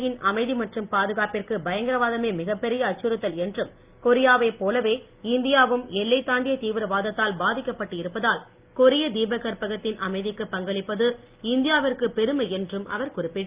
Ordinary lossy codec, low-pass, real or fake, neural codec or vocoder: Opus, 32 kbps; 3.6 kHz; real; none